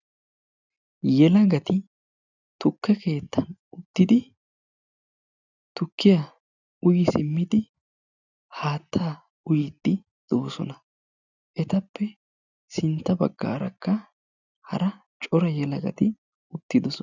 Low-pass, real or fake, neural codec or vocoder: 7.2 kHz; real; none